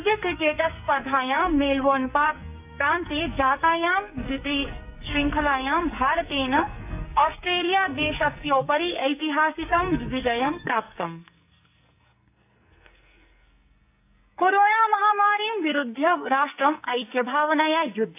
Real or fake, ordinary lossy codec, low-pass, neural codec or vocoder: fake; AAC, 32 kbps; 3.6 kHz; codec, 44.1 kHz, 2.6 kbps, SNAC